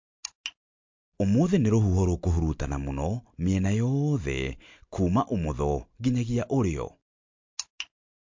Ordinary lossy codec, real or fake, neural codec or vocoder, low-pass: MP3, 48 kbps; real; none; 7.2 kHz